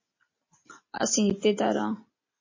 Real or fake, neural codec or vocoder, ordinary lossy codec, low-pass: real; none; MP3, 32 kbps; 7.2 kHz